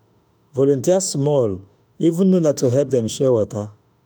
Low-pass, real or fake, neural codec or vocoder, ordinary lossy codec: none; fake; autoencoder, 48 kHz, 32 numbers a frame, DAC-VAE, trained on Japanese speech; none